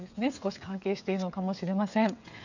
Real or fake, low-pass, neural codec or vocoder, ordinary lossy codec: fake; 7.2 kHz; codec, 16 kHz, 8 kbps, FreqCodec, smaller model; none